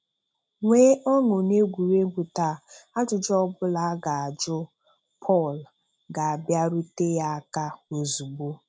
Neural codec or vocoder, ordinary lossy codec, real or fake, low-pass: none; none; real; none